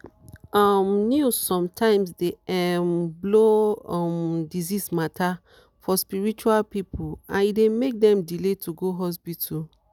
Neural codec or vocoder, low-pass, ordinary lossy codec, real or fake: none; 19.8 kHz; none; real